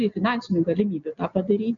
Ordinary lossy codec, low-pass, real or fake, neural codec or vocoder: MP3, 96 kbps; 7.2 kHz; real; none